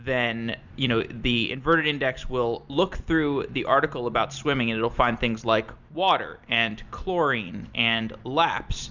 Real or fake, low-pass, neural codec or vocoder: real; 7.2 kHz; none